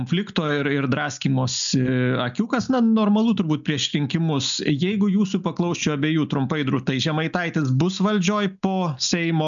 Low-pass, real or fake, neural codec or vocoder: 7.2 kHz; real; none